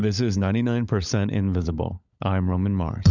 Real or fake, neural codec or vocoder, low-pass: fake; codec, 16 kHz, 16 kbps, FunCodec, trained on LibriTTS, 50 frames a second; 7.2 kHz